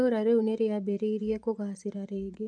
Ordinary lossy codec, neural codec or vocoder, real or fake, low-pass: none; vocoder, 24 kHz, 100 mel bands, Vocos; fake; 9.9 kHz